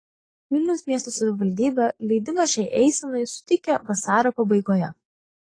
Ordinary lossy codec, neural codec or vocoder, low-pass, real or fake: AAC, 32 kbps; codec, 44.1 kHz, 7.8 kbps, DAC; 9.9 kHz; fake